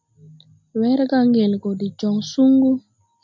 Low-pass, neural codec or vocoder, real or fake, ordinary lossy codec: 7.2 kHz; none; real; MP3, 64 kbps